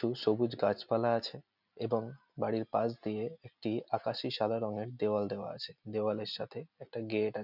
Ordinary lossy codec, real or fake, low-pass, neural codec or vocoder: none; real; 5.4 kHz; none